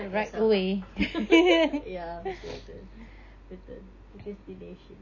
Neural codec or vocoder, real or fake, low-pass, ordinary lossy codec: none; real; 7.2 kHz; none